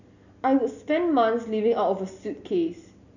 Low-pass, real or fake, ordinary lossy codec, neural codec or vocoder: 7.2 kHz; real; none; none